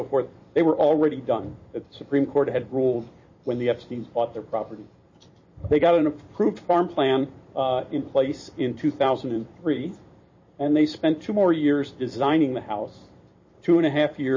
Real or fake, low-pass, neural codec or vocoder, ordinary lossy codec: fake; 7.2 kHz; vocoder, 44.1 kHz, 128 mel bands every 256 samples, BigVGAN v2; MP3, 32 kbps